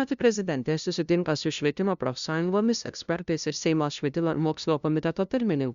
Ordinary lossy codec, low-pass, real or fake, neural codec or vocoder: Opus, 64 kbps; 7.2 kHz; fake; codec, 16 kHz, 0.5 kbps, FunCodec, trained on LibriTTS, 25 frames a second